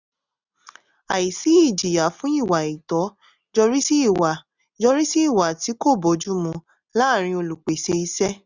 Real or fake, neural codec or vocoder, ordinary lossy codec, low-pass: real; none; none; 7.2 kHz